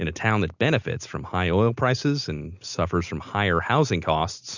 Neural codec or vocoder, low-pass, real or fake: none; 7.2 kHz; real